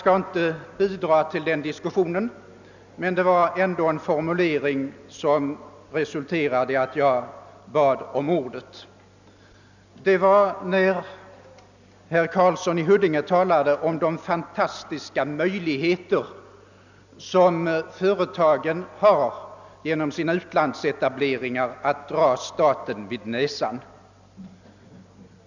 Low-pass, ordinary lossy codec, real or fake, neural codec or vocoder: 7.2 kHz; none; real; none